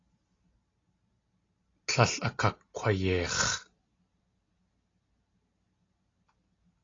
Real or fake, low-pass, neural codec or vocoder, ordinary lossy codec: real; 7.2 kHz; none; AAC, 32 kbps